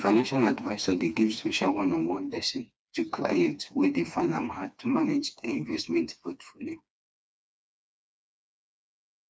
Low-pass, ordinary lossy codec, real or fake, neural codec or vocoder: none; none; fake; codec, 16 kHz, 2 kbps, FreqCodec, smaller model